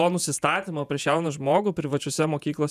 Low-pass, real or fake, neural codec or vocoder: 14.4 kHz; fake; vocoder, 48 kHz, 128 mel bands, Vocos